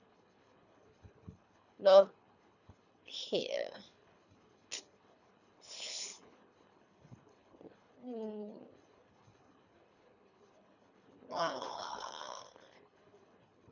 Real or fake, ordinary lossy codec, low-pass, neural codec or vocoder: fake; none; 7.2 kHz; codec, 24 kHz, 3 kbps, HILCodec